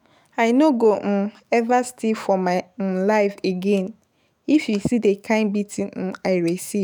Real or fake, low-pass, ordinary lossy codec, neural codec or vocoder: fake; none; none; autoencoder, 48 kHz, 128 numbers a frame, DAC-VAE, trained on Japanese speech